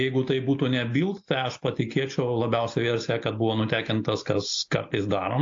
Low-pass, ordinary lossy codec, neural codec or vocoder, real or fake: 7.2 kHz; AAC, 48 kbps; none; real